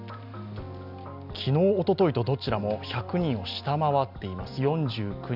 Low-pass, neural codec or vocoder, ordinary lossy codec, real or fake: 5.4 kHz; none; none; real